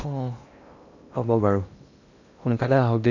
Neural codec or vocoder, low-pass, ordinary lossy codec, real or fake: codec, 16 kHz in and 24 kHz out, 0.8 kbps, FocalCodec, streaming, 65536 codes; 7.2 kHz; none; fake